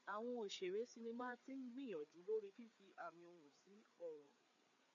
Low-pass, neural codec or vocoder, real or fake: 7.2 kHz; codec, 16 kHz, 4 kbps, FreqCodec, larger model; fake